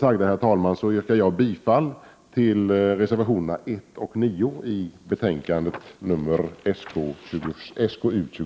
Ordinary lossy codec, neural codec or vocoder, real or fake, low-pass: none; none; real; none